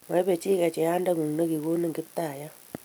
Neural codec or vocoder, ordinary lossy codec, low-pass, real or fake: none; none; none; real